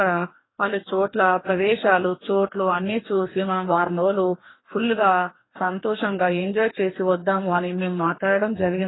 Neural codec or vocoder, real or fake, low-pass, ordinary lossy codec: codec, 44.1 kHz, 2.6 kbps, DAC; fake; 7.2 kHz; AAC, 16 kbps